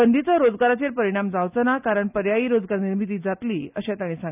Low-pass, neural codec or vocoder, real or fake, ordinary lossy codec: 3.6 kHz; none; real; none